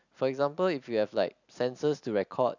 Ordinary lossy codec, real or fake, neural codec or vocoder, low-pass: none; real; none; 7.2 kHz